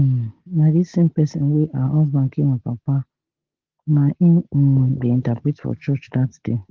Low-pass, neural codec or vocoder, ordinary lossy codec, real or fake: 7.2 kHz; none; Opus, 16 kbps; real